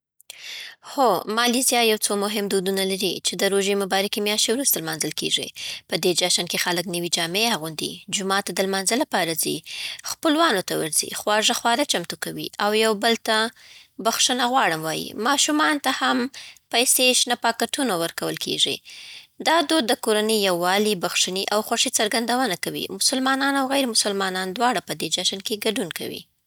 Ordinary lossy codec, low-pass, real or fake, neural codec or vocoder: none; none; real; none